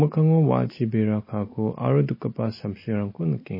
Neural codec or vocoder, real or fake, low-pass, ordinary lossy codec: none; real; 5.4 kHz; MP3, 24 kbps